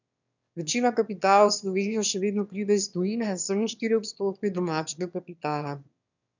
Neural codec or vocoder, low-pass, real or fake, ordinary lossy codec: autoencoder, 22.05 kHz, a latent of 192 numbers a frame, VITS, trained on one speaker; 7.2 kHz; fake; none